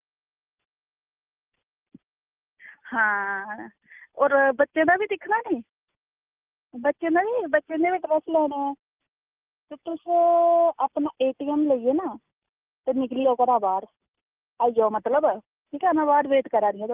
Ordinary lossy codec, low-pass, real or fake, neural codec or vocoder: Opus, 32 kbps; 3.6 kHz; real; none